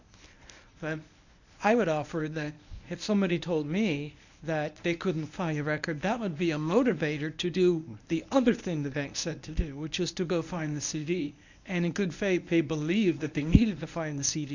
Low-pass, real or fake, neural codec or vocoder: 7.2 kHz; fake; codec, 24 kHz, 0.9 kbps, WavTokenizer, medium speech release version 1